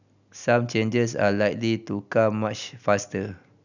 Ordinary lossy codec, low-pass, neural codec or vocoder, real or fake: none; 7.2 kHz; none; real